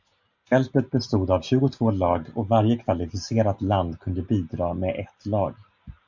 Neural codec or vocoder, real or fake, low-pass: none; real; 7.2 kHz